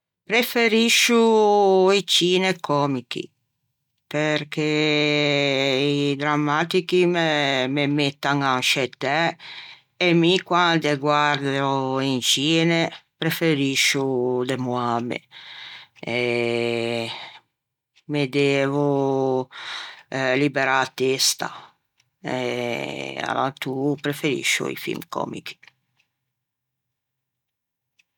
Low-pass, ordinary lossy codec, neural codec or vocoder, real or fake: 19.8 kHz; none; none; real